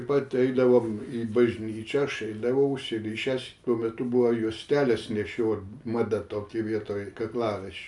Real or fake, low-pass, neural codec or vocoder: real; 10.8 kHz; none